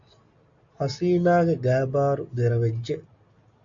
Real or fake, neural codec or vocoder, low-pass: real; none; 7.2 kHz